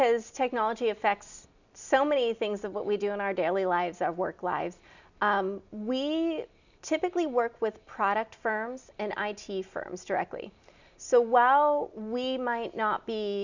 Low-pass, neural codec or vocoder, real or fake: 7.2 kHz; none; real